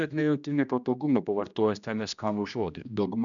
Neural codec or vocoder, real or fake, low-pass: codec, 16 kHz, 1 kbps, X-Codec, HuBERT features, trained on general audio; fake; 7.2 kHz